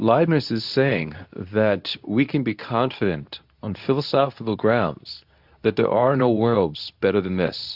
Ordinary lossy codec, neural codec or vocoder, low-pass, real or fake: AAC, 48 kbps; codec, 24 kHz, 0.9 kbps, WavTokenizer, medium speech release version 2; 5.4 kHz; fake